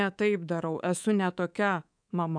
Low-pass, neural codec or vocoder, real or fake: 9.9 kHz; codec, 24 kHz, 3.1 kbps, DualCodec; fake